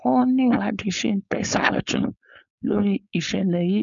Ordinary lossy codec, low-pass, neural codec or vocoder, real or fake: none; 7.2 kHz; codec, 16 kHz, 4.8 kbps, FACodec; fake